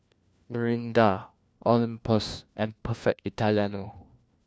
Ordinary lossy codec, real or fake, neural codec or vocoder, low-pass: none; fake; codec, 16 kHz, 1 kbps, FunCodec, trained on LibriTTS, 50 frames a second; none